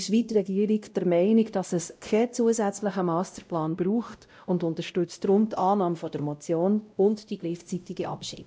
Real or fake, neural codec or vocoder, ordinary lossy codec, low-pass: fake; codec, 16 kHz, 0.5 kbps, X-Codec, WavLM features, trained on Multilingual LibriSpeech; none; none